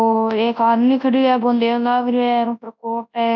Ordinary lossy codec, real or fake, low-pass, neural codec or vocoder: none; fake; 7.2 kHz; codec, 24 kHz, 0.9 kbps, WavTokenizer, large speech release